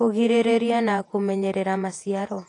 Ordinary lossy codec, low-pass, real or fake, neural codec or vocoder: AAC, 48 kbps; 10.8 kHz; fake; vocoder, 48 kHz, 128 mel bands, Vocos